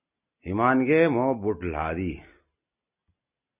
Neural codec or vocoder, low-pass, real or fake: none; 3.6 kHz; real